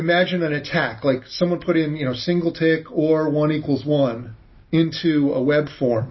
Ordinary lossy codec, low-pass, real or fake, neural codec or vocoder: MP3, 24 kbps; 7.2 kHz; real; none